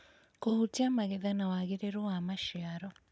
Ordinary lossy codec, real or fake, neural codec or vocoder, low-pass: none; real; none; none